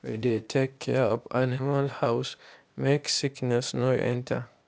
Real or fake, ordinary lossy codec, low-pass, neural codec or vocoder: fake; none; none; codec, 16 kHz, 0.8 kbps, ZipCodec